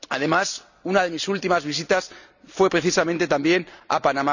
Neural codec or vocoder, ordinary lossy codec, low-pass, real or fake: none; none; 7.2 kHz; real